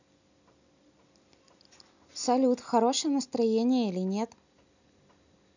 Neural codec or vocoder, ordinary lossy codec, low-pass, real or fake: none; none; 7.2 kHz; real